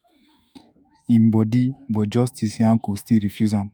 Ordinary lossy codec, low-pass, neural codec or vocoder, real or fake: none; none; autoencoder, 48 kHz, 32 numbers a frame, DAC-VAE, trained on Japanese speech; fake